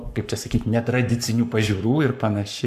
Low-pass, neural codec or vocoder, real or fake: 14.4 kHz; codec, 44.1 kHz, 7.8 kbps, Pupu-Codec; fake